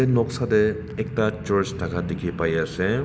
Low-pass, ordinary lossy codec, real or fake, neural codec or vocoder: none; none; real; none